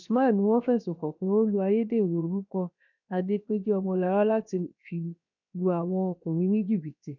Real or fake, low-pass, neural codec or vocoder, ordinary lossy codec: fake; 7.2 kHz; codec, 16 kHz, 0.7 kbps, FocalCodec; none